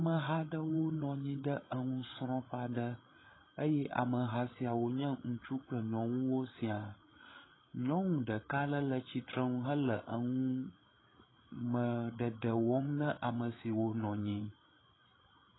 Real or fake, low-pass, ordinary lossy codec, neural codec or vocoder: fake; 7.2 kHz; AAC, 16 kbps; codec, 16 kHz, 8 kbps, FreqCodec, larger model